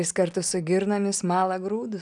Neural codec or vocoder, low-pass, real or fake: none; 10.8 kHz; real